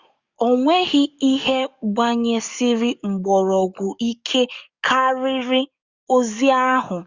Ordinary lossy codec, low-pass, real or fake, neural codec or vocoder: Opus, 64 kbps; 7.2 kHz; fake; codec, 44.1 kHz, 7.8 kbps, DAC